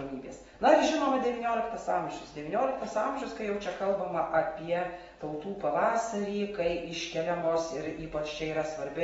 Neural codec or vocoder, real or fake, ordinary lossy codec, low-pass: none; real; AAC, 24 kbps; 14.4 kHz